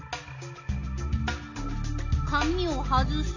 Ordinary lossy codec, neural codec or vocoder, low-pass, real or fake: none; none; 7.2 kHz; real